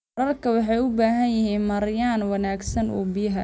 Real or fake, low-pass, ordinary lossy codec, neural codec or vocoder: real; none; none; none